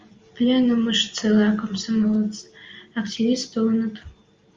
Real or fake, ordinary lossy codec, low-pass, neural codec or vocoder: real; Opus, 32 kbps; 7.2 kHz; none